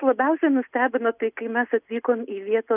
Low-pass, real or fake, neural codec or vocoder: 3.6 kHz; real; none